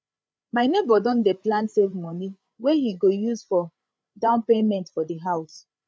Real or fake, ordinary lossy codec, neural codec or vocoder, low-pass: fake; none; codec, 16 kHz, 8 kbps, FreqCodec, larger model; none